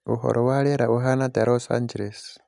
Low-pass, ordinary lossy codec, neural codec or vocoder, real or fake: 10.8 kHz; none; none; real